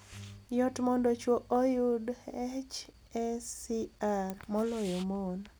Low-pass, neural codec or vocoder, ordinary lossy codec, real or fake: none; none; none; real